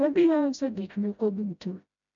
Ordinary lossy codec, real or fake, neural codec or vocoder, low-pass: MP3, 64 kbps; fake; codec, 16 kHz, 0.5 kbps, FreqCodec, smaller model; 7.2 kHz